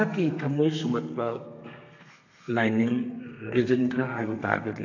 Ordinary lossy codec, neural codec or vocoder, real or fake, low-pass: AAC, 48 kbps; codec, 32 kHz, 1.9 kbps, SNAC; fake; 7.2 kHz